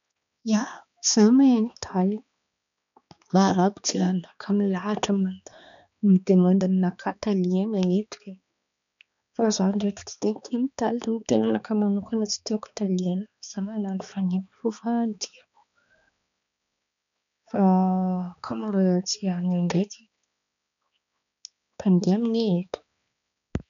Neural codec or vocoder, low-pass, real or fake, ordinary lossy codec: codec, 16 kHz, 2 kbps, X-Codec, HuBERT features, trained on balanced general audio; 7.2 kHz; fake; none